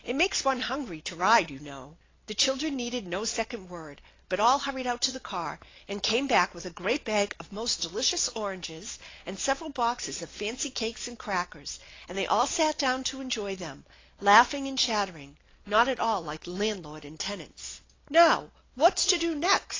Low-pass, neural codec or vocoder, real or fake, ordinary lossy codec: 7.2 kHz; none; real; AAC, 32 kbps